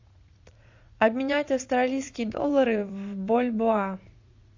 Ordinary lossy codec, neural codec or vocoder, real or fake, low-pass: AAC, 48 kbps; vocoder, 44.1 kHz, 80 mel bands, Vocos; fake; 7.2 kHz